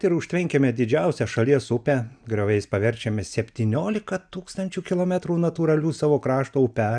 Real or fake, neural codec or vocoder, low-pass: real; none; 9.9 kHz